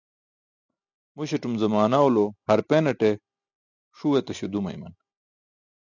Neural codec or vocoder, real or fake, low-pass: none; real; 7.2 kHz